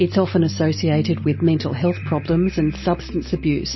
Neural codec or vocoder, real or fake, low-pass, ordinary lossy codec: none; real; 7.2 kHz; MP3, 24 kbps